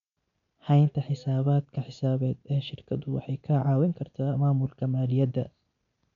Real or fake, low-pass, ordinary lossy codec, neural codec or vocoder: real; 7.2 kHz; none; none